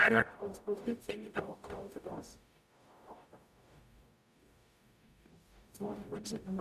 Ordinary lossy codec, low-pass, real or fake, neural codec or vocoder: none; 14.4 kHz; fake; codec, 44.1 kHz, 0.9 kbps, DAC